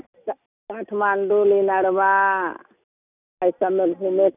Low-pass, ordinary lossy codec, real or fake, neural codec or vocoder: 3.6 kHz; none; real; none